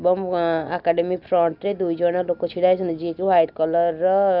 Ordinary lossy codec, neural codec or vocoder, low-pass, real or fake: none; none; 5.4 kHz; real